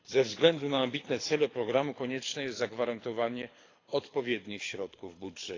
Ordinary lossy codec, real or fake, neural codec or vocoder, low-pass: AAC, 32 kbps; fake; codec, 24 kHz, 6 kbps, HILCodec; 7.2 kHz